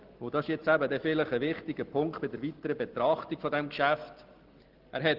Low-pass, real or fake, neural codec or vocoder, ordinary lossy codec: 5.4 kHz; real; none; Opus, 16 kbps